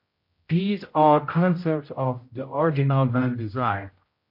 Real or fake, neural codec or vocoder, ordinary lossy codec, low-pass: fake; codec, 16 kHz, 0.5 kbps, X-Codec, HuBERT features, trained on general audio; MP3, 32 kbps; 5.4 kHz